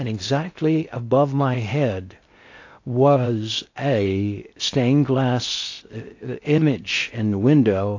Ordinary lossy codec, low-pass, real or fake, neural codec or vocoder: AAC, 48 kbps; 7.2 kHz; fake; codec, 16 kHz in and 24 kHz out, 0.6 kbps, FocalCodec, streaming, 2048 codes